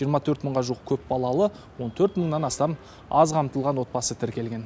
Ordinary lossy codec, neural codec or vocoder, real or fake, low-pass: none; none; real; none